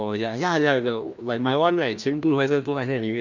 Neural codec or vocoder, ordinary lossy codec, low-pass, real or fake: codec, 16 kHz, 1 kbps, FreqCodec, larger model; none; 7.2 kHz; fake